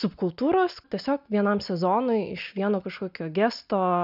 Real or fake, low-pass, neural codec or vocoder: real; 5.4 kHz; none